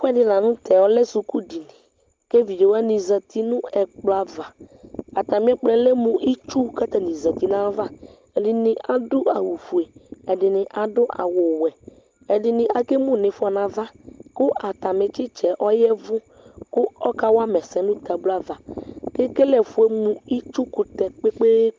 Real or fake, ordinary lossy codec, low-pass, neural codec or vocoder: real; Opus, 24 kbps; 7.2 kHz; none